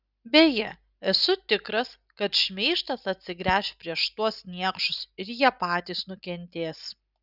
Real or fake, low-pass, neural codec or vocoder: real; 5.4 kHz; none